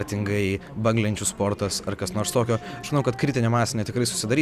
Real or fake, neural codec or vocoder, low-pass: real; none; 14.4 kHz